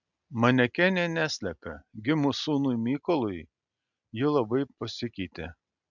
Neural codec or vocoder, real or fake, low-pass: none; real; 7.2 kHz